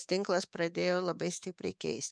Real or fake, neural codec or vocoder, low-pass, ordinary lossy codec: fake; codec, 24 kHz, 3.1 kbps, DualCodec; 9.9 kHz; MP3, 96 kbps